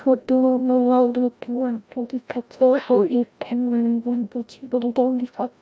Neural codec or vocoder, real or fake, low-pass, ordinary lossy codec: codec, 16 kHz, 0.5 kbps, FreqCodec, larger model; fake; none; none